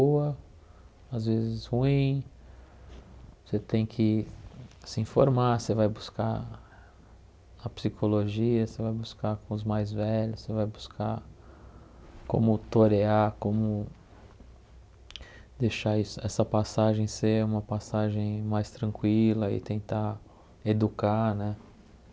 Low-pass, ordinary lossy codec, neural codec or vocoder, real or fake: none; none; none; real